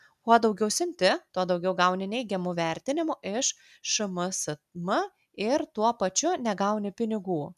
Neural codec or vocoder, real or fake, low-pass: none; real; 14.4 kHz